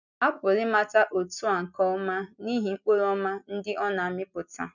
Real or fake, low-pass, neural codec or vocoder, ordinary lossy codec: real; 7.2 kHz; none; none